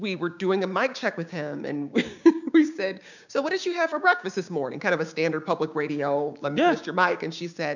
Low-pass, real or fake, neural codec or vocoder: 7.2 kHz; fake; codec, 16 kHz, 6 kbps, DAC